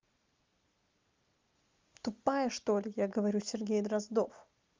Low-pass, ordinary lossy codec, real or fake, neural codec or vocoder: 7.2 kHz; Opus, 32 kbps; real; none